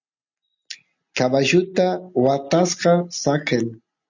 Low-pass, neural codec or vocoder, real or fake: 7.2 kHz; none; real